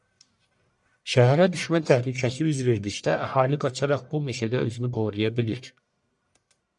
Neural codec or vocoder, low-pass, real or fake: codec, 44.1 kHz, 1.7 kbps, Pupu-Codec; 10.8 kHz; fake